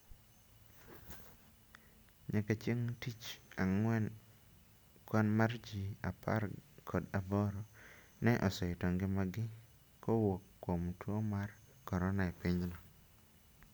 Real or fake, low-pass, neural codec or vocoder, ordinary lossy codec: real; none; none; none